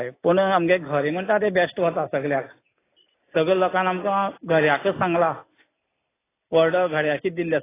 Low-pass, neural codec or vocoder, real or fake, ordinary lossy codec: 3.6 kHz; none; real; AAC, 16 kbps